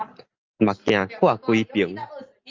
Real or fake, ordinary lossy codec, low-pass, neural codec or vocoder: real; Opus, 24 kbps; 7.2 kHz; none